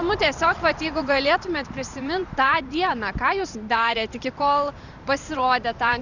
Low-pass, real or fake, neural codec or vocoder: 7.2 kHz; fake; vocoder, 44.1 kHz, 128 mel bands every 512 samples, BigVGAN v2